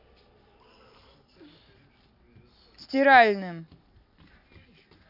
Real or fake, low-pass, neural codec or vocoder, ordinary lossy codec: real; 5.4 kHz; none; none